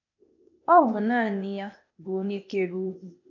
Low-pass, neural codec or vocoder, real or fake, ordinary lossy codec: 7.2 kHz; codec, 16 kHz, 0.8 kbps, ZipCodec; fake; none